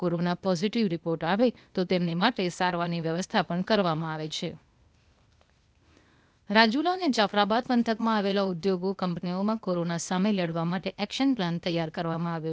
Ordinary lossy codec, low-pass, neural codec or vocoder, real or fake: none; none; codec, 16 kHz, 0.8 kbps, ZipCodec; fake